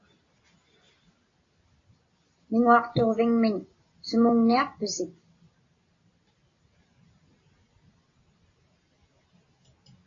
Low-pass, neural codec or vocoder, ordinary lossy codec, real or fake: 7.2 kHz; none; AAC, 32 kbps; real